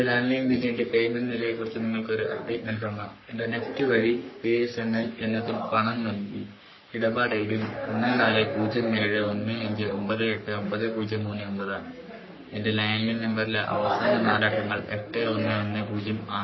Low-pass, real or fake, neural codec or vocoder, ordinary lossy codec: 7.2 kHz; fake; codec, 44.1 kHz, 3.4 kbps, Pupu-Codec; MP3, 24 kbps